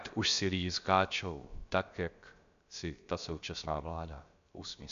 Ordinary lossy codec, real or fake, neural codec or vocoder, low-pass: MP3, 64 kbps; fake; codec, 16 kHz, about 1 kbps, DyCAST, with the encoder's durations; 7.2 kHz